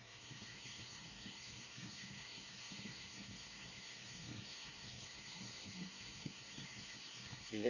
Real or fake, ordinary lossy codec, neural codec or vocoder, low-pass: fake; none; codec, 24 kHz, 1 kbps, SNAC; 7.2 kHz